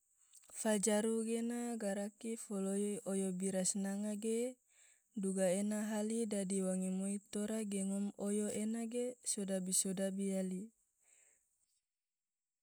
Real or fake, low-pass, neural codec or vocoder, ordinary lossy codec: real; none; none; none